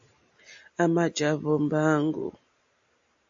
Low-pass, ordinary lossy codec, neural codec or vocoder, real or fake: 7.2 kHz; AAC, 64 kbps; none; real